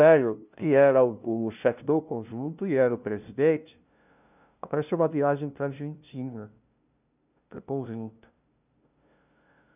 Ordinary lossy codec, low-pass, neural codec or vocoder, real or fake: none; 3.6 kHz; codec, 16 kHz, 0.5 kbps, FunCodec, trained on LibriTTS, 25 frames a second; fake